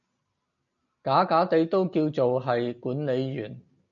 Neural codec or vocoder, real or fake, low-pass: none; real; 7.2 kHz